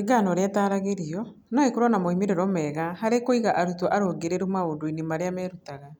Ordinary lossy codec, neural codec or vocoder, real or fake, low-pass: none; none; real; none